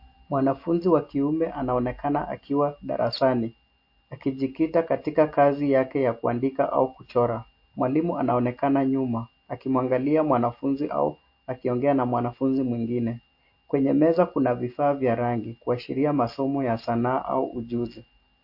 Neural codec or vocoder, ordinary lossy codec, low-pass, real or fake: none; MP3, 32 kbps; 5.4 kHz; real